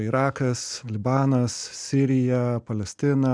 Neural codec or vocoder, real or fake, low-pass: none; real; 9.9 kHz